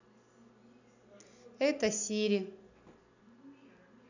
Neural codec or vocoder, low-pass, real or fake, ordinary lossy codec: none; 7.2 kHz; real; none